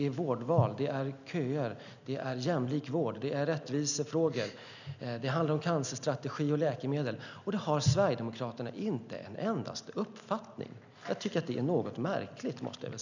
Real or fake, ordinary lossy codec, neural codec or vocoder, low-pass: real; none; none; 7.2 kHz